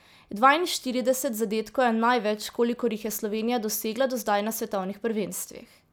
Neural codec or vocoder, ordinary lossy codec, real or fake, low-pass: none; none; real; none